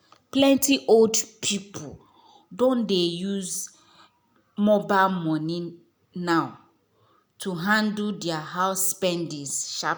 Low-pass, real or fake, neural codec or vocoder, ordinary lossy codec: none; real; none; none